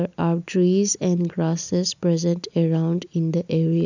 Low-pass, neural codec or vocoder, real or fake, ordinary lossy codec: 7.2 kHz; none; real; none